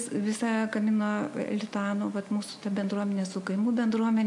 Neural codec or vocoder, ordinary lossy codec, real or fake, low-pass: none; AAC, 64 kbps; real; 10.8 kHz